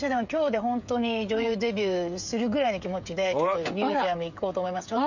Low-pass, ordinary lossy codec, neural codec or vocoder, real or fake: 7.2 kHz; none; codec, 16 kHz, 16 kbps, FreqCodec, smaller model; fake